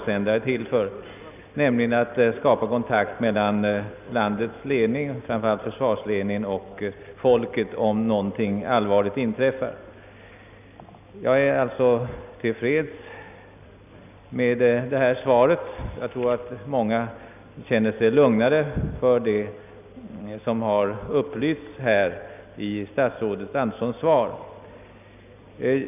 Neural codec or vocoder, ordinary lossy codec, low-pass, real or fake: none; none; 3.6 kHz; real